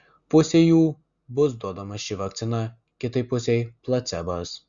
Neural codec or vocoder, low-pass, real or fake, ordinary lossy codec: none; 7.2 kHz; real; Opus, 64 kbps